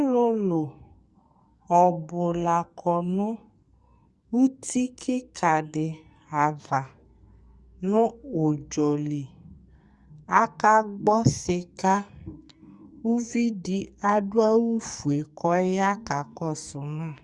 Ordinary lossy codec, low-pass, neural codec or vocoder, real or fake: Opus, 64 kbps; 10.8 kHz; codec, 44.1 kHz, 2.6 kbps, SNAC; fake